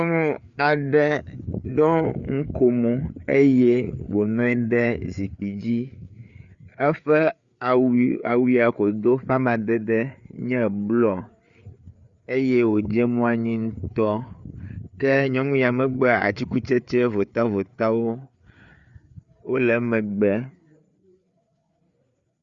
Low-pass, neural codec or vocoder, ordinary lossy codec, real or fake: 7.2 kHz; codec, 16 kHz, 4 kbps, FreqCodec, larger model; Opus, 64 kbps; fake